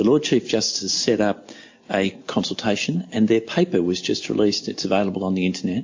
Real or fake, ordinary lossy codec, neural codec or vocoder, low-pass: real; MP3, 48 kbps; none; 7.2 kHz